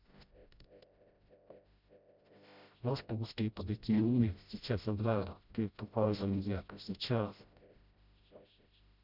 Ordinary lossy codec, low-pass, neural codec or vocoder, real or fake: none; 5.4 kHz; codec, 16 kHz, 0.5 kbps, FreqCodec, smaller model; fake